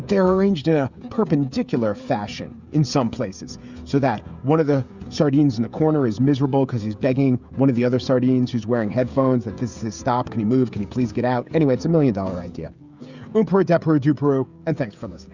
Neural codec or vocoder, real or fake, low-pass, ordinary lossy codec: codec, 16 kHz, 16 kbps, FreqCodec, smaller model; fake; 7.2 kHz; Opus, 64 kbps